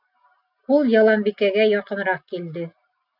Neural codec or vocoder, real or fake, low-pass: none; real; 5.4 kHz